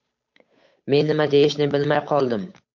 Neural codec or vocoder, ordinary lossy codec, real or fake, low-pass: codec, 16 kHz, 8 kbps, FunCodec, trained on Chinese and English, 25 frames a second; MP3, 48 kbps; fake; 7.2 kHz